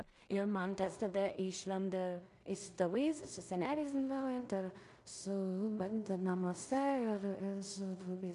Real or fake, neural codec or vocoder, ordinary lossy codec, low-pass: fake; codec, 16 kHz in and 24 kHz out, 0.4 kbps, LongCat-Audio-Codec, two codebook decoder; MP3, 64 kbps; 10.8 kHz